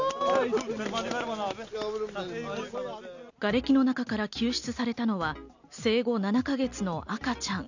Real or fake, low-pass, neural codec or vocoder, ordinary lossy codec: real; 7.2 kHz; none; none